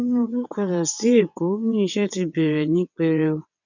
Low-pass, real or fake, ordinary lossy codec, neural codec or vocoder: 7.2 kHz; fake; none; vocoder, 22.05 kHz, 80 mel bands, WaveNeXt